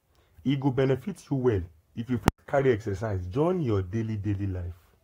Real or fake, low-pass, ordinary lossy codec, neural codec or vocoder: fake; 19.8 kHz; AAC, 48 kbps; codec, 44.1 kHz, 7.8 kbps, Pupu-Codec